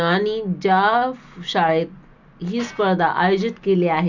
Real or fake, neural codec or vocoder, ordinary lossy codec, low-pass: real; none; none; 7.2 kHz